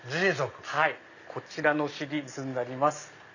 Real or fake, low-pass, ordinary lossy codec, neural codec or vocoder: real; 7.2 kHz; none; none